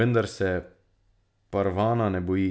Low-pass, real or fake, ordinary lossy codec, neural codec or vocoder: none; real; none; none